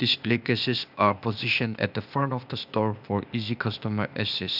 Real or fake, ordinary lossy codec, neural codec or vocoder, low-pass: fake; none; codec, 16 kHz, 0.8 kbps, ZipCodec; 5.4 kHz